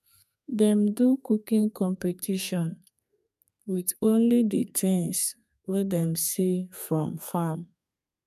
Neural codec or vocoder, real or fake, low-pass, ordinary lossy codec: codec, 32 kHz, 1.9 kbps, SNAC; fake; 14.4 kHz; none